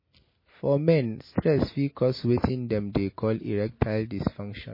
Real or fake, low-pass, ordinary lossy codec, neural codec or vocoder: real; 5.4 kHz; MP3, 24 kbps; none